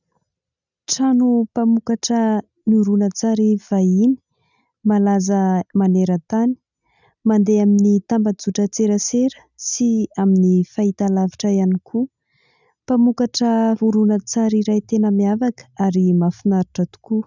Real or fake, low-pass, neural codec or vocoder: real; 7.2 kHz; none